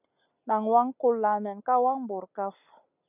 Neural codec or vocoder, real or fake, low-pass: none; real; 3.6 kHz